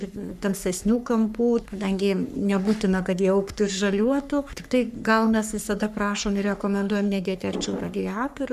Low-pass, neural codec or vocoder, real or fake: 14.4 kHz; codec, 44.1 kHz, 3.4 kbps, Pupu-Codec; fake